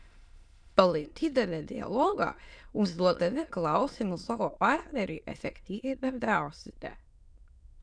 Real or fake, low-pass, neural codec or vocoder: fake; 9.9 kHz; autoencoder, 22.05 kHz, a latent of 192 numbers a frame, VITS, trained on many speakers